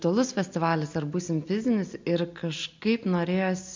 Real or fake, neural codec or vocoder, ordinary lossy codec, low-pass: real; none; MP3, 64 kbps; 7.2 kHz